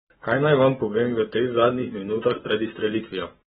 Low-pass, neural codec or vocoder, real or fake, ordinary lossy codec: 19.8 kHz; codec, 44.1 kHz, 7.8 kbps, Pupu-Codec; fake; AAC, 16 kbps